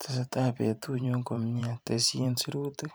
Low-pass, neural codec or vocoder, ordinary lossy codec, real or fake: none; vocoder, 44.1 kHz, 128 mel bands every 512 samples, BigVGAN v2; none; fake